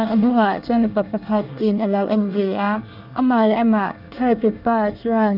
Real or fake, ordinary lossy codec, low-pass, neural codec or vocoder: fake; none; 5.4 kHz; codec, 24 kHz, 1 kbps, SNAC